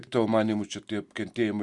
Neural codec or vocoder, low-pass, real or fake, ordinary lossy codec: none; 10.8 kHz; real; Opus, 64 kbps